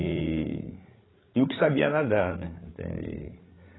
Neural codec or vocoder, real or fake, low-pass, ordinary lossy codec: codec, 16 kHz, 16 kbps, FreqCodec, larger model; fake; 7.2 kHz; AAC, 16 kbps